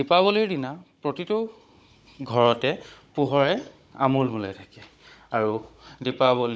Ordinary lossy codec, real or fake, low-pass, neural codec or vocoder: none; fake; none; codec, 16 kHz, 16 kbps, FunCodec, trained on Chinese and English, 50 frames a second